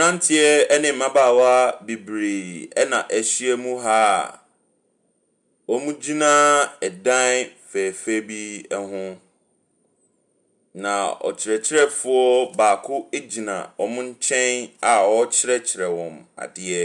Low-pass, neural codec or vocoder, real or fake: 10.8 kHz; none; real